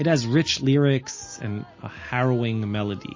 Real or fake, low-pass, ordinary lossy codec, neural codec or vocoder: real; 7.2 kHz; MP3, 32 kbps; none